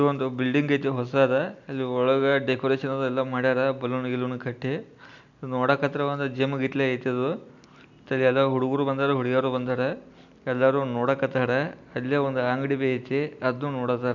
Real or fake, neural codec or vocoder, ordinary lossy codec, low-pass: real; none; none; 7.2 kHz